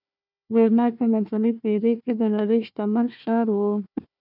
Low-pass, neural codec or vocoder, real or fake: 5.4 kHz; codec, 16 kHz, 1 kbps, FunCodec, trained on Chinese and English, 50 frames a second; fake